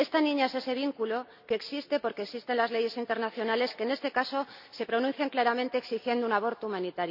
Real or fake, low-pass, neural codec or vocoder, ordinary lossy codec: real; 5.4 kHz; none; none